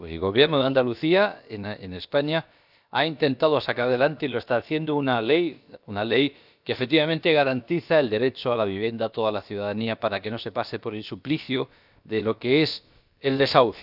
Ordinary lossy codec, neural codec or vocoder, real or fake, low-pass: none; codec, 16 kHz, about 1 kbps, DyCAST, with the encoder's durations; fake; 5.4 kHz